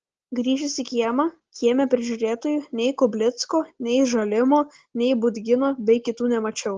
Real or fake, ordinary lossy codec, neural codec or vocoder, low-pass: real; Opus, 16 kbps; none; 7.2 kHz